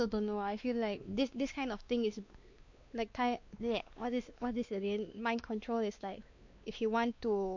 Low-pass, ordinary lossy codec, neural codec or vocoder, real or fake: 7.2 kHz; MP3, 48 kbps; codec, 16 kHz, 2 kbps, X-Codec, WavLM features, trained on Multilingual LibriSpeech; fake